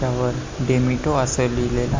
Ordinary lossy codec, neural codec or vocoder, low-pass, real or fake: MP3, 64 kbps; none; 7.2 kHz; real